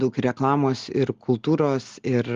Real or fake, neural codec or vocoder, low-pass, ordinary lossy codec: real; none; 7.2 kHz; Opus, 32 kbps